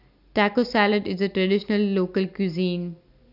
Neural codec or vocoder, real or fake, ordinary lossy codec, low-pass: none; real; none; 5.4 kHz